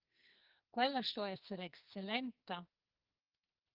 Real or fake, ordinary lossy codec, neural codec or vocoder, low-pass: fake; Opus, 32 kbps; codec, 44.1 kHz, 2.6 kbps, SNAC; 5.4 kHz